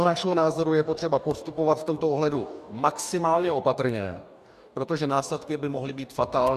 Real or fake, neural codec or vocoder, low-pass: fake; codec, 44.1 kHz, 2.6 kbps, DAC; 14.4 kHz